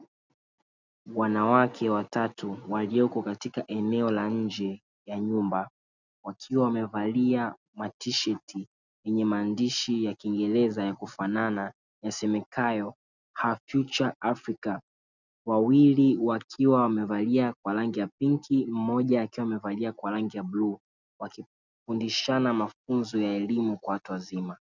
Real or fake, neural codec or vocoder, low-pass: real; none; 7.2 kHz